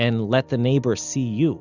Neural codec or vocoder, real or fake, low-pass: none; real; 7.2 kHz